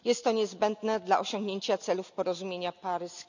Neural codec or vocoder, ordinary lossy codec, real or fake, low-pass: none; none; real; 7.2 kHz